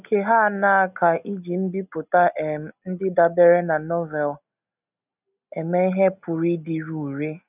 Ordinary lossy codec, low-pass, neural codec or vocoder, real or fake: none; 3.6 kHz; none; real